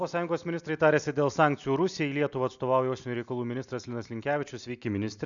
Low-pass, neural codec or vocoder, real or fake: 7.2 kHz; none; real